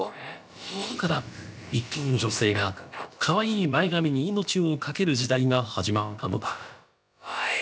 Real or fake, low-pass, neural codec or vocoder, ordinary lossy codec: fake; none; codec, 16 kHz, about 1 kbps, DyCAST, with the encoder's durations; none